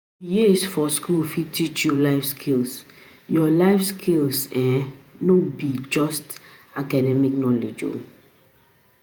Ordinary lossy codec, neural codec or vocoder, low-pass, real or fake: none; vocoder, 48 kHz, 128 mel bands, Vocos; none; fake